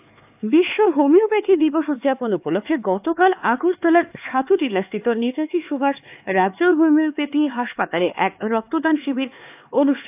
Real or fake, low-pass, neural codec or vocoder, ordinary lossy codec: fake; 3.6 kHz; codec, 16 kHz, 4 kbps, X-Codec, HuBERT features, trained on LibriSpeech; AAC, 32 kbps